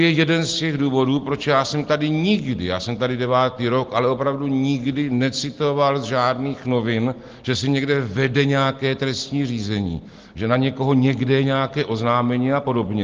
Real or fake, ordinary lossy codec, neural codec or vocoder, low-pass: real; Opus, 16 kbps; none; 7.2 kHz